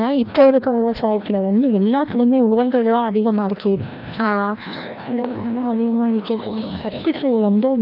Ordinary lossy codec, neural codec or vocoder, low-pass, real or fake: none; codec, 16 kHz, 1 kbps, FreqCodec, larger model; 5.4 kHz; fake